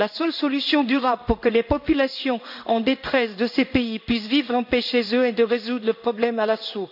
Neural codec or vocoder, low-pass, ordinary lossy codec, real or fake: codec, 16 kHz in and 24 kHz out, 1 kbps, XY-Tokenizer; 5.4 kHz; none; fake